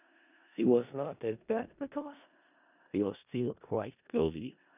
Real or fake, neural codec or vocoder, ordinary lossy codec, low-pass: fake; codec, 16 kHz in and 24 kHz out, 0.4 kbps, LongCat-Audio-Codec, four codebook decoder; none; 3.6 kHz